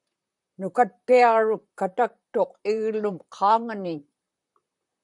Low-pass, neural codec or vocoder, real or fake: 10.8 kHz; vocoder, 44.1 kHz, 128 mel bands, Pupu-Vocoder; fake